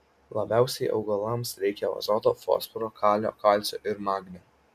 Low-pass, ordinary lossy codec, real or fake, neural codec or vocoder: 14.4 kHz; MP3, 96 kbps; real; none